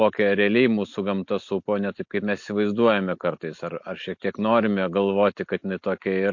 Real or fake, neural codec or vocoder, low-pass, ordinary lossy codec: real; none; 7.2 kHz; MP3, 48 kbps